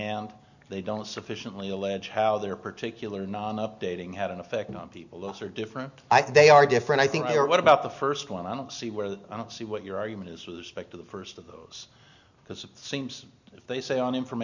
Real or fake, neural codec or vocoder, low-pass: real; none; 7.2 kHz